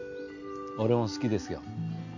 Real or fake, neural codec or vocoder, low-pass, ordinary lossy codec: real; none; 7.2 kHz; none